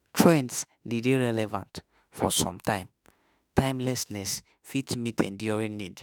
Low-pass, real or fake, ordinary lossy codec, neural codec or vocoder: none; fake; none; autoencoder, 48 kHz, 32 numbers a frame, DAC-VAE, trained on Japanese speech